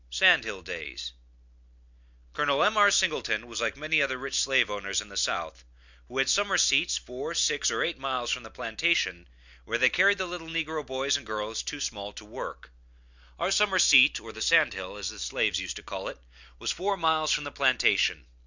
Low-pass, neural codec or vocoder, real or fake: 7.2 kHz; none; real